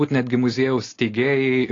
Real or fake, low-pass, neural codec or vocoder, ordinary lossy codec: real; 7.2 kHz; none; AAC, 32 kbps